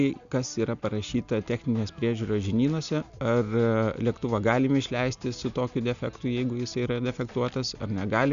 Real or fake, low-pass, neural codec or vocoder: real; 7.2 kHz; none